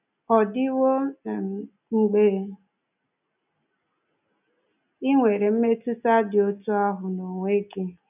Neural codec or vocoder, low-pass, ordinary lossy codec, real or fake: none; 3.6 kHz; none; real